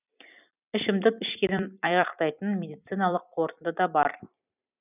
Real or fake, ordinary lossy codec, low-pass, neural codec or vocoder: fake; none; 3.6 kHz; vocoder, 44.1 kHz, 128 mel bands every 256 samples, BigVGAN v2